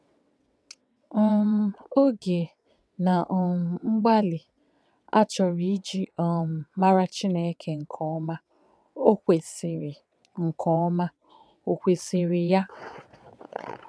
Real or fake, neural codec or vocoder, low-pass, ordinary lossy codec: fake; vocoder, 22.05 kHz, 80 mel bands, WaveNeXt; none; none